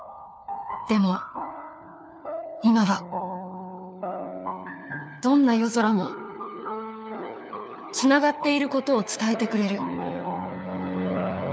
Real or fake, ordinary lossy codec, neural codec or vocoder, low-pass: fake; none; codec, 16 kHz, 4 kbps, FunCodec, trained on LibriTTS, 50 frames a second; none